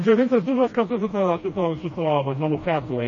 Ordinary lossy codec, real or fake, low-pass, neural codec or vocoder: MP3, 32 kbps; fake; 7.2 kHz; codec, 16 kHz, 1 kbps, FreqCodec, smaller model